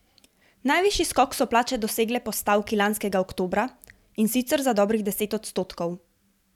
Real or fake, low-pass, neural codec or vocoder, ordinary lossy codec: fake; 19.8 kHz; vocoder, 44.1 kHz, 128 mel bands every 512 samples, BigVGAN v2; none